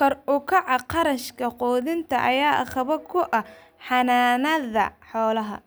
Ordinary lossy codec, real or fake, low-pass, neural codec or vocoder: none; real; none; none